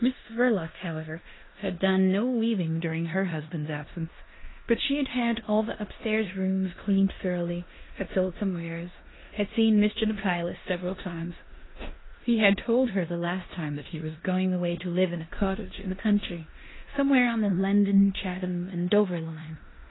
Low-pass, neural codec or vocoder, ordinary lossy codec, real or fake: 7.2 kHz; codec, 16 kHz in and 24 kHz out, 0.9 kbps, LongCat-Audio-Codec, four codebook decoder; AAC, 16 kbps; fake